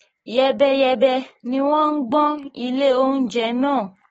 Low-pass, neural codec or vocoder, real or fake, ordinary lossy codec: 19.8 kHz; codec, 44.1 kHz, 7.8 kbps, DAC; fake; AAC, 24 kbps